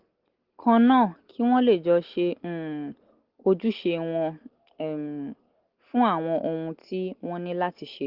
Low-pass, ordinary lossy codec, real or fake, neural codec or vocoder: 5.4 kHz; Opus, 16 kbps; real; none